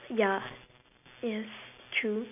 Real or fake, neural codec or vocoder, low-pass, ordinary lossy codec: real; none; 3.6 kHz; none